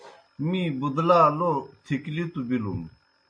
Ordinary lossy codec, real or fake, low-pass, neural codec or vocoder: MP3, 48 kbps; real; 9.9 kHz; none